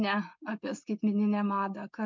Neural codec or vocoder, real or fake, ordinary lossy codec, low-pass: none; real; MP3, 64 kbps; 7.2 kHz